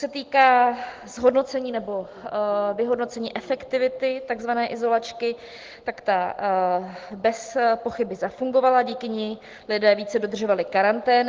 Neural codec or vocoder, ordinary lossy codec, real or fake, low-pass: none; Opus, 16 kbps; real; 7.2 kHz